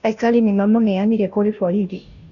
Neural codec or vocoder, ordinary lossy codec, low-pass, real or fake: codec, 16 kHz, 0.5 kbps, FunCodec, trained on Chinese and English, 25 frames a second; none; 7.2 kHz; fake